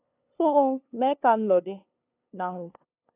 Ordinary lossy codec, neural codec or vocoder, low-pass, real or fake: AAC, 24 kbps; codec, 16 kHz, 2 kbps, FunCodec, trained on LibriTTS, 25 frames a second; 3.6 kHz; fake